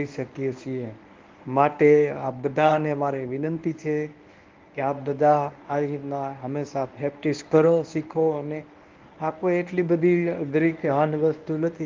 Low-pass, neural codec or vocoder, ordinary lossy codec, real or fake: 7.2 kHz; codec, 24 kHz, 0.9 kbps, WavTokenizer, medium speech release version 1; Opus, 32 kbps; fake